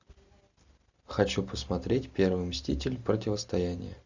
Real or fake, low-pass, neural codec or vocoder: real; 7.2 kHz; none